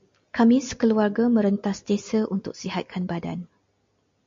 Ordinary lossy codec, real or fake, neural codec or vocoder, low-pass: MP3, 48 kbps; real; none; 7.2 kHz